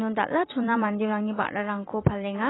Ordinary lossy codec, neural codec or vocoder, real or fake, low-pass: AAC, 16 kbps; vocoder, 44.1 kHz, 128 mel bands every 256 samples, BigVGAN v2; fake; 7.2 kHz